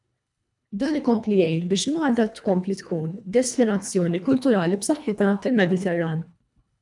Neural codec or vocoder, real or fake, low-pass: codec, 24 kHz, 1.5 kbps, HILCodec; fake; 10.8 kHz